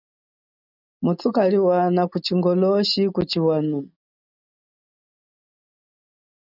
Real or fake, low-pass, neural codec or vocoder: real; 5.4 kHz; none